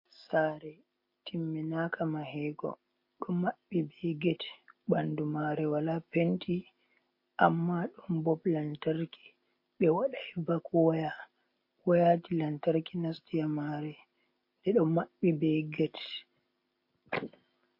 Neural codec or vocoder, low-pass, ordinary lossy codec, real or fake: none; 5.4 kHz; MP3, 32 kbps; real